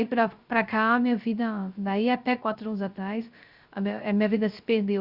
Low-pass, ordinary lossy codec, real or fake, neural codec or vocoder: 5.4 kHz; Opus, 64 kbps; fake; codec, 16 kHz, 0.3 kbps, FocalCodec